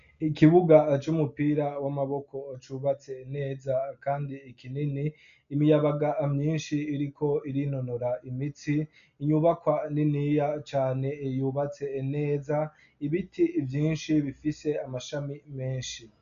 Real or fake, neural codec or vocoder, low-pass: real; none; 7.2 kHz